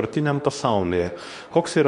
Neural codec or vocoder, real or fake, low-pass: codec, 24 kHz, 0.9 kbps, WavTokenizer, medium speech release version 2; fake; 10.8 kHz